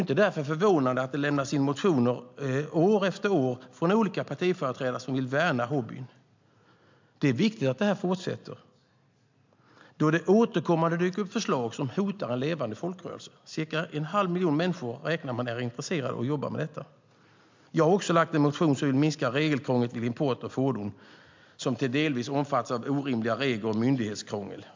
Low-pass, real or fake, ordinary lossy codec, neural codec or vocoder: 7.2 kHz; real; MP3, 64 kbps; none